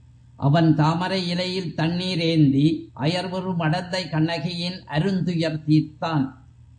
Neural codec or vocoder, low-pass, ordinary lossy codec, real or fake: none; 9.9 kHz; MP3, 48 kbps; real